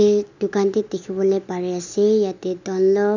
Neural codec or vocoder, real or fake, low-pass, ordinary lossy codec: none; real; 7.2 kHz; none